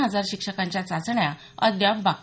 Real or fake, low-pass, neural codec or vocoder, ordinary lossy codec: real; none; none; none